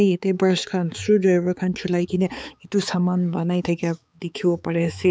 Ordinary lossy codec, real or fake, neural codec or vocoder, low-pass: none; fake; codec, 16 kHz, 4 kbps, X-Codec, HuBERT features, trained on balanced general audio; none